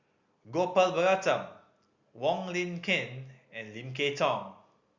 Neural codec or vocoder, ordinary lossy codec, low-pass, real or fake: none; Opus, 64 kbps; 7.2 kHz; real